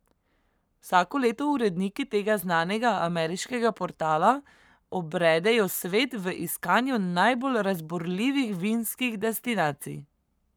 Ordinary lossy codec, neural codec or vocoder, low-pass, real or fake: none; codec, 44.1 kHz, 7.8 kbps, Pupu-Codec; none; fake